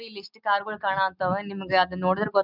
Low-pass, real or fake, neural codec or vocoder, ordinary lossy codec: 5.4 kHz; real; none; none